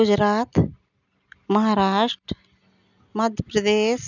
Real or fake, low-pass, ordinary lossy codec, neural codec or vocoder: real; 7.2 kHz; none; none